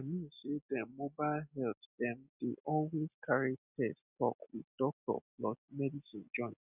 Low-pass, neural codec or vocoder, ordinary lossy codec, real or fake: 3.6 kHz; none; none; real